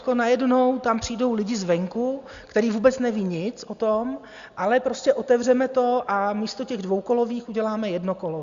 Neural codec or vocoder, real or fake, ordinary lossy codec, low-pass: none; real; Opus, 64 kbps; 7.2 kHz